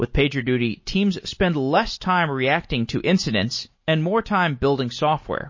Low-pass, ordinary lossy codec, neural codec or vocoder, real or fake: 7.2 kHz; MP3, 32 kbps; none; real